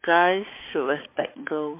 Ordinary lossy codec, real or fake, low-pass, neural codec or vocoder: MP3, 24 kbps; fake; 3.6 kHz; codec, 16 kHz, 4 kbps, X-Codec, HuBERT features, trained on balanced general audio